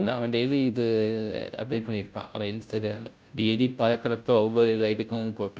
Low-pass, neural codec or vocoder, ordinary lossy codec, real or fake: none; codec, 16 kHz, 0.5 kbps, FunCodec, trained on Chinese and English, 25 frames a second; none; fake